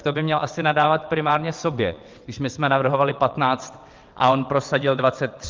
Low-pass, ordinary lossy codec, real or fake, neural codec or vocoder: 7.2 kHz; Opus, 32 kbps; fake; vocoder, 22.05 kHz, 80 mel bands, WaveNeXt